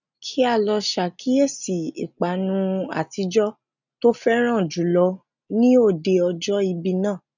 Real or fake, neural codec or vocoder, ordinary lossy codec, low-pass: real; none; none; 7.2 kHz